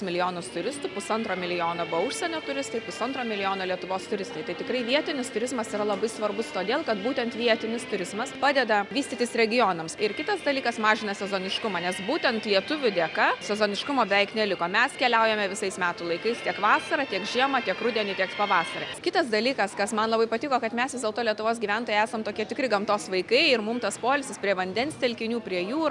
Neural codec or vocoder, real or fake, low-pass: none; real; 10.8 kHz